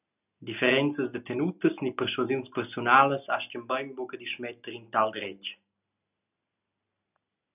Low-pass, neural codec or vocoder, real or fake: 3.6 kHz; none; real